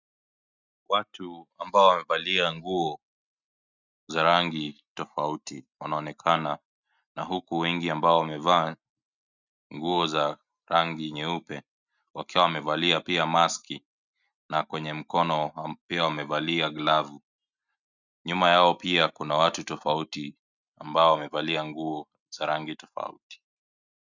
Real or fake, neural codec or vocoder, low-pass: real; none; 7.2 kHz